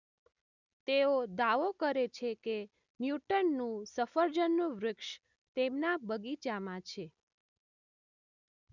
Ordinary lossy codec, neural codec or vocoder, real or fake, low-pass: none; none; real; 7.2 kHz